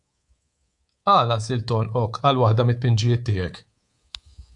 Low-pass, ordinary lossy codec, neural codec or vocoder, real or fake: 10.8 kHz; AAC, 64 kbps; codec, 24 kHz, 3.1 kbps, DualCodec; fake